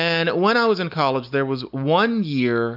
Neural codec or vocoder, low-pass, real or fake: none; 5.4 kHz; real